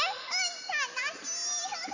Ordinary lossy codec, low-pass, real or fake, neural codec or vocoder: none; 7.2 kHz; real; none